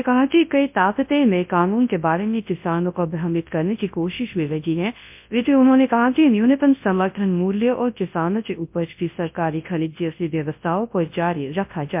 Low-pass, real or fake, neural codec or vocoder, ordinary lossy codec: 3.6 kHz; fake; codec, 24 kHz, 0.9 kbps, WavTokenizer, large speech release; MP3, 32 kbps